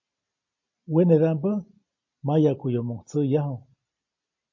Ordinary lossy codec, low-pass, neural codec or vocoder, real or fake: MP3, 32 kbps; 7.2 kHz; none; real